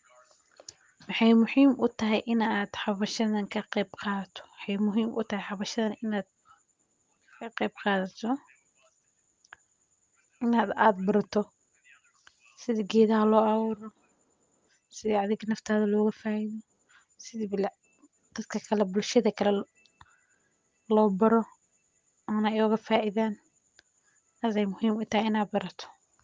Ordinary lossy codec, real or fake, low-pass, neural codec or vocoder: Opus, 32 kbps; real; 7.2 kHz; none